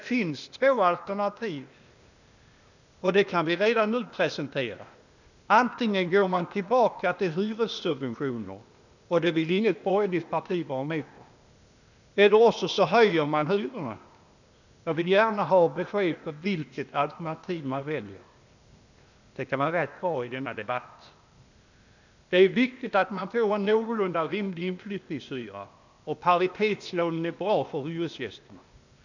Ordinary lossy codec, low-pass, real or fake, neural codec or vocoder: none; 7.2 kHz; fake; codec, 16 kHz, 0.8 kbps, ZipCodec